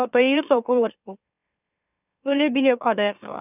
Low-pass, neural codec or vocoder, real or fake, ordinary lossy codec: 3.6 kHz; autoencoder, 44.1 kHz, a latent of 192 numbers a frame, MeloTTS; fake; none